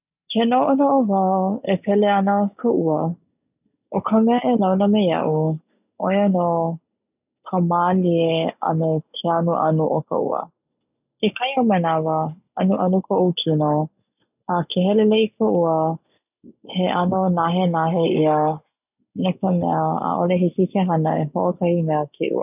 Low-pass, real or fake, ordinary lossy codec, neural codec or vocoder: 3.6 kHz; real; none; none